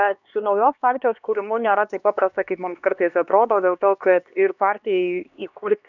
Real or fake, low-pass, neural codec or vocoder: fake; 7.2 kHz; codec, 16 kHz, 2 kbps, X-Codec, HuBERT features, trained on LibriSpeech